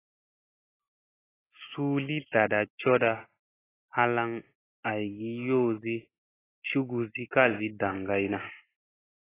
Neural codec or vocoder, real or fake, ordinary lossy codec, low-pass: none; real; AAC, 16 kbps; 3.6 kHz